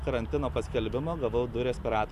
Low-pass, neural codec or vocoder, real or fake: 14.4 kHz; none; real